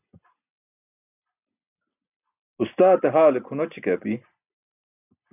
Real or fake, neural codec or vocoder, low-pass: real; none; 3.6 kHz